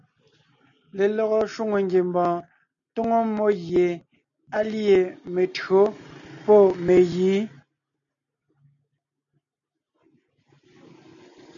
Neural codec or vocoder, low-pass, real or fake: none; 7.2 kHz; real